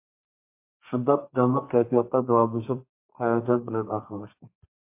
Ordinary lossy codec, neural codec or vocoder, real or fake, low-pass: MP3, 24 kbps; codec, 32 kHz, 1.9 kbps, SNAC; fake; 3.6 kHz